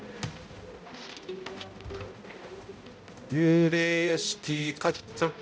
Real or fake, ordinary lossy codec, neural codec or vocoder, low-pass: fake; none; codec, 16 kHz, 0.5 kbps, X-Codec, HuBERT features, trained on balanced general audio; none